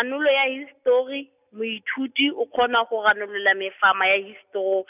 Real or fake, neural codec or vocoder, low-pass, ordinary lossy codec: real; none; 3.6 kHz; none